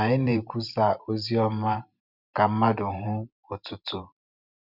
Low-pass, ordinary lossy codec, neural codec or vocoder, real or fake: 5.4 kHz; none; vocoder, 44.1 kHz, 128 mel bands every 512 samples, BigVGAN v2; fake